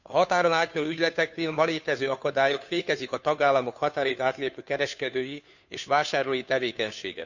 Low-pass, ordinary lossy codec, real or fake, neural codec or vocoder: 7.2 kHz; none; fake; codec, 16 kHz, 2 kbps, FunCodec, trained on Chinese and English, 25 frames a second